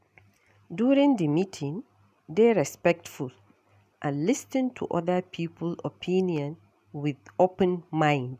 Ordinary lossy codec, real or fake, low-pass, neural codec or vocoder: none; real; 14.4 kHz; none